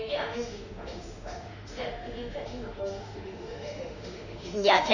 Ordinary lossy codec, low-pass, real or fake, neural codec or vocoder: none; 7.2 kHz; fake; autoencoder, 48 kHz, 32 numbers a frame, DAC-VAE, trained on Japanese speech